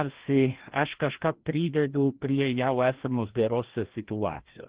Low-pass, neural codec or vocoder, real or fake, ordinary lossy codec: 3.6 kHz; codec, 16 kHz, 1 kbps, FreqCodec, larger model; fake; Opus, 16 kbps